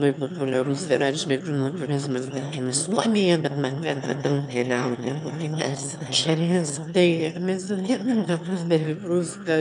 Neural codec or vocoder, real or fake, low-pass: autoencoder, 22.05 kHz, a latent of 192 numbers a frame, VITS, trained on one speaker; fake; 9.9 kHz